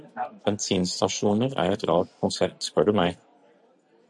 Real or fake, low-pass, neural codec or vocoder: real; 10.8 kHz; none